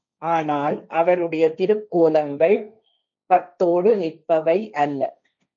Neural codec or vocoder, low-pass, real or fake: codec, 16 kHz, 1.1 kbps, Voila-Tokenizer; 7.2 kHz; fake